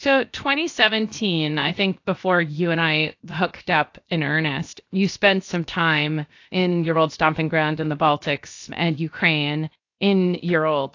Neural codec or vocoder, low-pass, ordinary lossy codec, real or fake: codec, 16 kHz, about 1 kbps, DyCAST, with the encoder's durations; 7.2 kHz; AAC, 48 kbps; fake